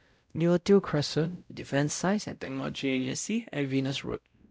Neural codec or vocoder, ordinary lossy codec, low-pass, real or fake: codec, 16 kHz, 0.5 kbps, X-Codec, WavLM features, trained on Multilingual LibriSpeech; none; none; fake